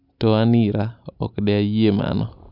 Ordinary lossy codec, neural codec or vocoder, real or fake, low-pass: none; none; real; 5.4 kHz